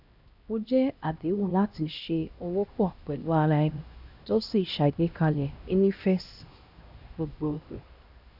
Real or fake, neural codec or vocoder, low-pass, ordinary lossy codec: fake; codec, 16 kHz, 1 kbps, X-Codec, HuBERT features, trained on LibriSpeech; 5.4 kHz; none